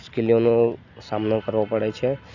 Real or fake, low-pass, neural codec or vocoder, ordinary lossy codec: real; 7.2 kHz; none; none